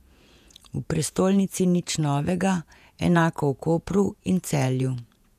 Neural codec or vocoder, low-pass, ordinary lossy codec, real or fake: vocoder, 48 kHz, 128 mel bands, Vocos; 14.4 kHz; none; fake